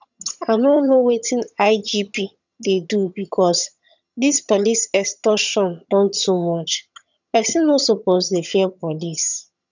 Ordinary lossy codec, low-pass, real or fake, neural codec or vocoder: none; 7.2 kHz; fake; vocoder, 22.05 kHz, 80 mel bands, HiFi-GAN